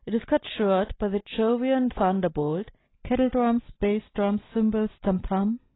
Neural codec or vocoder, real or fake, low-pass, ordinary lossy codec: none; real; 7.2 kHz; AAC, 16 kbps